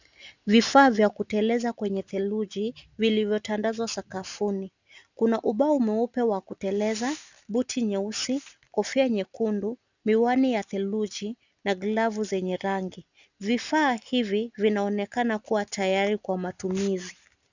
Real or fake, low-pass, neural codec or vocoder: real; 7.2 kHz; none